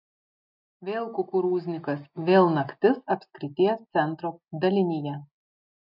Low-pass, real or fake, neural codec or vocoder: 5.4 kHz; real; none